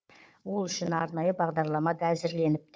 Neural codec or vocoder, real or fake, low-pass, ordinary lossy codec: codec, 16 kHz, 4 kbps, FunCodec, trained on Chinese and English, 50 frames a second; fake; none; none